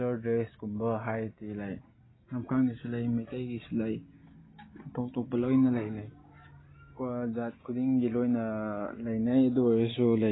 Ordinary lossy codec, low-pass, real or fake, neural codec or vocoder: AAC, 16 kbps; 7.2 kHz; real; none